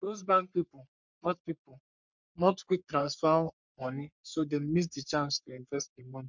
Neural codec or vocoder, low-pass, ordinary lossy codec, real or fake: codec, 44.1 kHz, 3.4 kbps, Pupu-Codec; 7.2 kHz; MP3, 64 kbps; fake